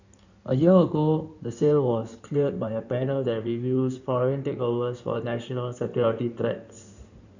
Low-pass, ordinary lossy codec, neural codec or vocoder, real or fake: 7.2 kHz; none; codec, 16 kHz in and 24 kHz out, 2.2 kbps, FireRedTTS-2 codec; fake